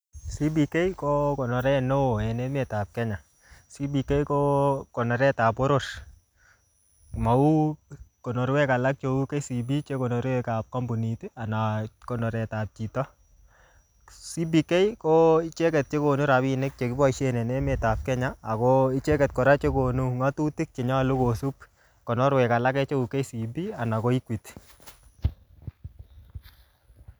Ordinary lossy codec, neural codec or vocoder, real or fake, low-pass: none; none; real; none